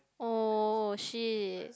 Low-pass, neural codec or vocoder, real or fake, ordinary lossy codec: none; none; real; none